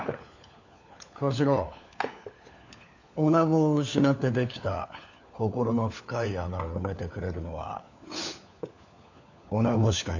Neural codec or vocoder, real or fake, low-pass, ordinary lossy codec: codec, 16 kHz, 4 kbps, FunCodec, trained on LibriTTS, 50 frames a second; fake; 7.2 kHz; none